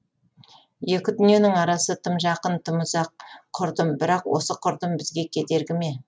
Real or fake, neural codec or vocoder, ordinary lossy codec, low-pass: real; none; none; none